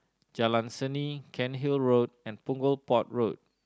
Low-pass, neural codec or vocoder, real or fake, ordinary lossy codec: none; none; real; none